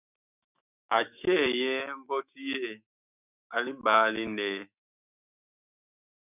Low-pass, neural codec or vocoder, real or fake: 3.6 kHz; autoencoder, 48 kHz, 128 numbers a frame, DAC-VAE, trained on Japanese speech; fake